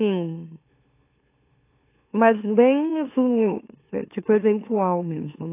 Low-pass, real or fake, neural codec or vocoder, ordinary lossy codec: 3.6 kHz; fake; autoencoder, 44.1 kHz, a latent of 192 numbers a frame, MeloTTS; AAC, 24 kbps